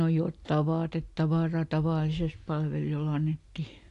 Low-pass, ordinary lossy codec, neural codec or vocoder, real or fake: 10.8 kHz; AAC, 48 kbps; none; real